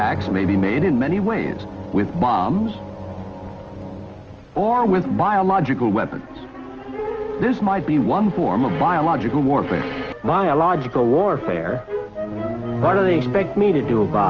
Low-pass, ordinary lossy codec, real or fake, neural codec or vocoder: 7.2 kHz; Opus, 24 kbps; real; none